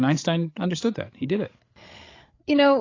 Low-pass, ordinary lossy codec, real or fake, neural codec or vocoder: 7.2 kHz; AAC, 32 kbps; fake; codec, 16 kHz, 8 kbps, FreqCodec, larger model